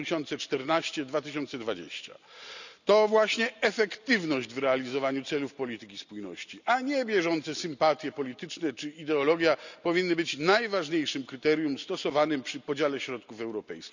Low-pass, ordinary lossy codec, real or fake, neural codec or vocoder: 7.2 kHz; none; real; none